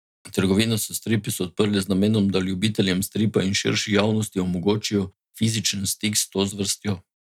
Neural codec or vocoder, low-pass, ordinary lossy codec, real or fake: none; 19.8 kHz; none; real